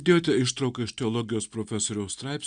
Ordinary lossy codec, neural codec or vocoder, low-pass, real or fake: Opus, 64 kbps; none; 9.9 kHz; real